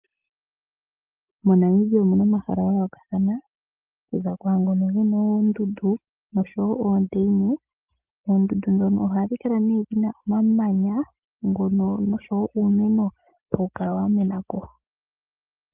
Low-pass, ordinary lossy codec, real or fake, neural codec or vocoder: 3.6 kHz; Opus, 24 kbps; real; none